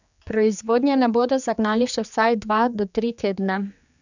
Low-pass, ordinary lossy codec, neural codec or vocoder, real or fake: 7.2 kHz; none; codec, 16 kHz, 4 kbps, X-Codec, HuBERT features, trained on general audio; fake